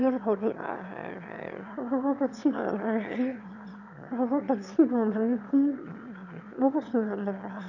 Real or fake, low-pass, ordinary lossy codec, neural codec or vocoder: fake; 7.2 kHz; none; autoencoder, 22.05 kHz, a latent of 192 numbers a frame, VITS, trained on one speaker